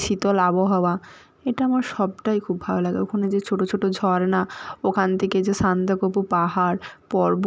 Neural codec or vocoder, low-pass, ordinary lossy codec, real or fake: none; none; none; real